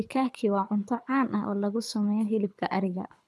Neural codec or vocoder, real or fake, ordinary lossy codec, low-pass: codec, 24 kHz, 6 kbps, HILCodec; fake; none; none